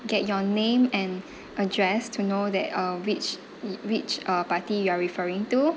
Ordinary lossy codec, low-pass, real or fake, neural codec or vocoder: none; none; real; none